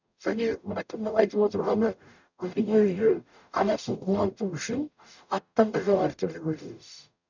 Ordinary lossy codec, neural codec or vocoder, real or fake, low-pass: none; codec, 44.1 kHz, 0.9 kbps, DAC; fake; 7.2 kHz